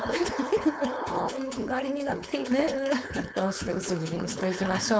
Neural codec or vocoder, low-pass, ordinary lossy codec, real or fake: codec, 16 kHz, 4.8 kbps, FACodec; none; none; fake